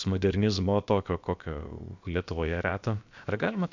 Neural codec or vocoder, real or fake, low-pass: codec, 16 kHz, about 1 kbps, DyCAST, with the encoder's durations; fake; 7.2 kHz